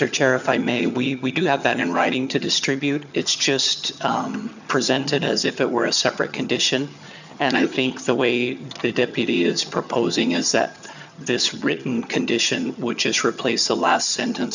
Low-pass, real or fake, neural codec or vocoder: 7.2 kHz; fake; vocoder, 22.05 kHz, 80 mel bands, HiFi-GAN